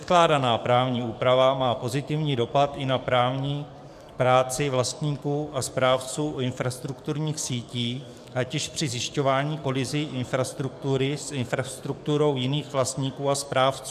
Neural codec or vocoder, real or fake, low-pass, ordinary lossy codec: codec, 44.1 kHz, 7.8 kbps, DAC; fake; 14.4 kHz; AAC, 96 kbps